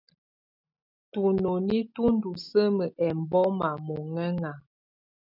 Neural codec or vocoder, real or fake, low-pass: none; real; 5.4 kHz